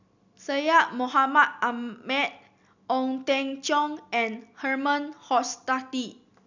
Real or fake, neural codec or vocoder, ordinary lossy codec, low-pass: real; none; none; 7.2 kHz